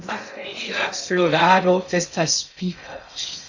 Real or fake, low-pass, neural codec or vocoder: fake; 7.2 kHz; codec, 16 kHz in and 24 kHz out, 0.6 kbps, FocalCodec, streaming, 2048 codes